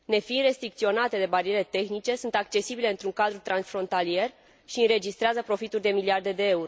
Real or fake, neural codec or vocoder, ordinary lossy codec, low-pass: real; none; none; none